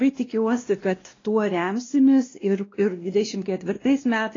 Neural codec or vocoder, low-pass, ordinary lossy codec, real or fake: codec, 16 kHz, 1 kbps, X-Codec, WavLM features, trained on Multilingual LibriSpeech; 7.2 kHz; AAC, 32 kbps; fake